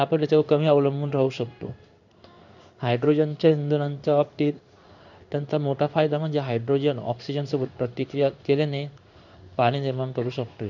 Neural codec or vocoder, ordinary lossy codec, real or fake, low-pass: codec, 16 kHz in and 24 kHz out, 1 kbps, XY-Tokenizer; none; fake; 7.2 kHz